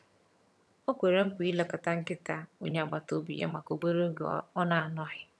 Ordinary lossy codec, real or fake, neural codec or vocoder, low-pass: none; fake; vocoder, 22.05 kHz, 80 mel bands, HiFi-GAN; none